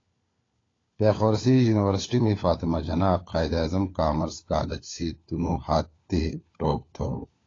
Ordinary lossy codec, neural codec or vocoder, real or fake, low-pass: AAC, 32 kbps; codec, 16 kHz, 4 kbps, FunCodec, trained on LibriTTS, 50 frames a second; fake; 7.2 kHz